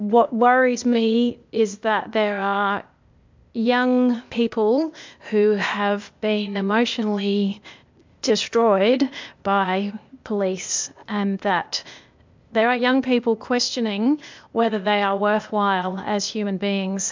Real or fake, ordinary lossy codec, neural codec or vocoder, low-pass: fake; MP3, 64 kbps; codec, 16 kHz, 0.8 kbps, ZipCodec; 7.2 kHz